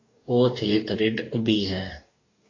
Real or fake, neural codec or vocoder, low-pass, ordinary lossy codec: fake; codec, 44.1 kHz, 2.6 kbps, DAC; 7.2 kHz; AAC, 32 kbps